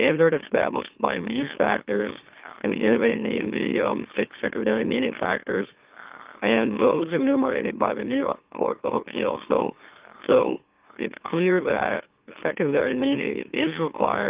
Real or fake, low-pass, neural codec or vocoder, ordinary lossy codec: fake; 3.6 kHz; autoencoder, 44.1 kHz, a latent of 192 numbers a frame, MeloTTS; Opus, 32 kbps